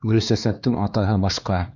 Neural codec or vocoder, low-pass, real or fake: codec, 16 kHz, 2 kbps, X-Codec, HuBERT features, trained on LibriSpeech; 7.2 kHz; fake